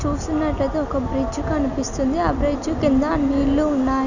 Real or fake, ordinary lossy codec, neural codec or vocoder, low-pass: real; none; none; 7.2 kHz